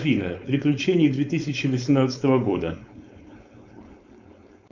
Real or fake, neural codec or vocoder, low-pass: fake; codec, 16 kHz, 4.8 kbps, FACodec; 7.2 kHz